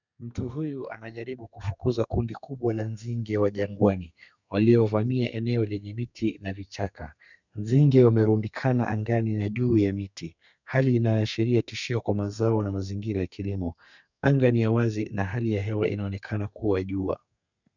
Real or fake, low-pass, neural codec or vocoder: fake; 7.2 kHz; codec, 32 kHz, 1.9 kbps, SNAC